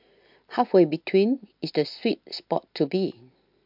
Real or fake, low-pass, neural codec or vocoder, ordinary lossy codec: real; 5.4 kHz; none; MP3, 48 kbps